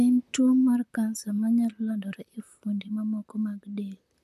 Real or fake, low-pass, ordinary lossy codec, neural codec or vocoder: fake; 14.4 kHz; MP3, 96 kbps; vocoder, 44.1 kHz, 128 mel bands, Pupu-Vocoder